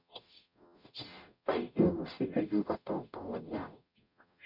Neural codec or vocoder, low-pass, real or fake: codec, 44.1 kHz, 0.9 kbps, DAC; 5.4 kHz; fake